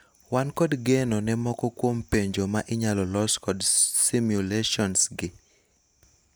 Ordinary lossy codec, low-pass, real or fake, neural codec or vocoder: none; none; real; none